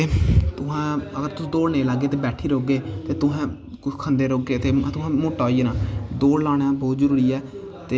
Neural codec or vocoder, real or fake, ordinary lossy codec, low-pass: none; real; none; none